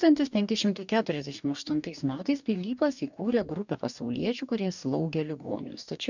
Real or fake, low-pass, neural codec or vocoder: fake; 7.2 kHz; codec, 44.1 kHz, 2.6 kbps, DAC